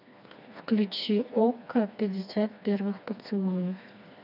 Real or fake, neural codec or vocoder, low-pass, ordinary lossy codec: fake; codec, 16 kHz, 2 kbps, FreqCodec, smaller model; 5.4 kHz; none